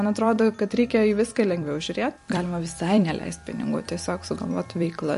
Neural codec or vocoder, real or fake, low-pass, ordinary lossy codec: vocoder, 44.1 kHz, 128 mel bands every 256 samples, BigVGAN v2; fake; 14.4 kHz; MP3, 48 kbps